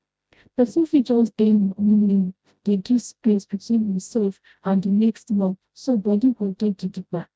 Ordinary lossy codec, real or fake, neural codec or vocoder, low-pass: none; fake; codec, 16 kHz, 0.5 kbps, FreqCodec, smaller model; none